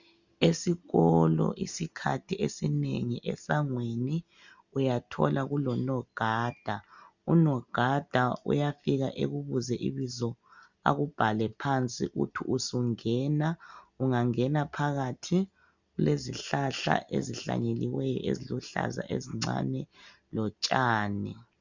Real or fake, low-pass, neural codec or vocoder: real; 7.2 kHz; none